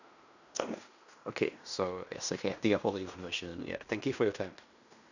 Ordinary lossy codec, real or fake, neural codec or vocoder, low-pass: none; fake; codec, 16 kHz in and 24 kHz out, 0.9 kbps, LongCat-Audio-Codec, fine tuned four codebook decoder; 7.2 kHz